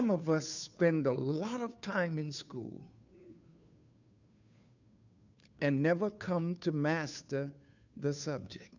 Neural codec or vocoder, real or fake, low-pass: codec, 16 kHz, 2 kbps, FunCodec, trained on Chinese and English, 25 frames a second; fake; 7.2 kHz